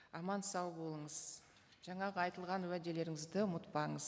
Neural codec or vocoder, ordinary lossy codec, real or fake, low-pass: none; none; real; none